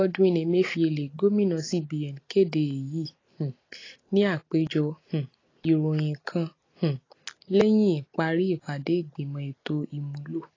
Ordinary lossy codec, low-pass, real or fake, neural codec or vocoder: AAC, 32 kbps; 7.2 kHz; real; none